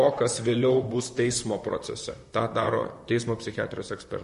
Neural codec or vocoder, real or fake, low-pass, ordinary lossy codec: vocoder, 44.1 kHz, 128 mel bands, Pupu-Vocoder; fake; 14.4 kHz; MP3, 48 kbps